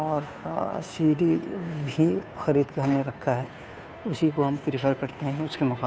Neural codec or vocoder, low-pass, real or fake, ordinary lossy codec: codec, 16 kHz, 2 kbps, FunCodec, trained on Chinese and English, 25 frames a second; none; fake; none